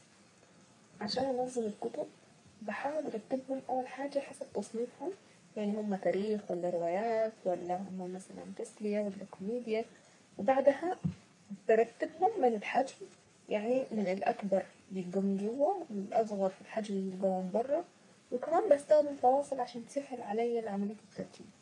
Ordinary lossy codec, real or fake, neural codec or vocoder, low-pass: MP3, 48 kbps; fake; codec, 44.1 kHz, 3.4 kbps, Pupu-Codec; 10.8 kHz